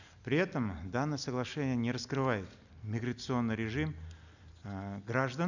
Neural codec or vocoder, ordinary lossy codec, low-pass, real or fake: none; none; 7.2 kHz; real